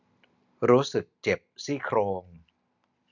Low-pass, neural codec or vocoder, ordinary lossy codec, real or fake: 7.2 kHz; none; none; real